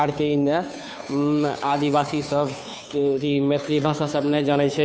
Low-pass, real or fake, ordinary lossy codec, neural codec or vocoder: none; fake; none; codec, 16 kHz, 2 kbps, FunCodec, trained on Chinese and English, 25 frames a second